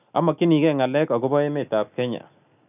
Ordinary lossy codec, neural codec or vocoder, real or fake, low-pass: none; none; real; 3.6 kHz